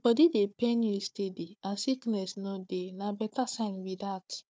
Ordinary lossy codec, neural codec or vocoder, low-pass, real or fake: none; codec, 16 kHz, 4 kbps, FunCodec, trained on Chinese and English, 50 frames a second; none; fake